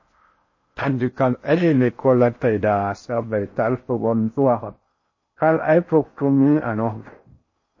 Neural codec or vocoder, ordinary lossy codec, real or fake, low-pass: codec, 16 kHz in and 24 kHz out, 0.6 kbps, FocalCodec, streaming, 4096 codes; MP3, 32 kbps; fake; 7.2 kHz